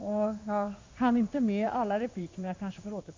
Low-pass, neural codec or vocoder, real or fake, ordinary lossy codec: 7.2 kHz; codec, 16 kHz in and 24 kHz out, 1 kbps, XY-Tokenizer; fake; MP3, 64 kbps